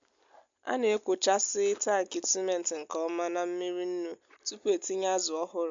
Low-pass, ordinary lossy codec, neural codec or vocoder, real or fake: 7.2 kHz; MP3, 64 kbps; none; real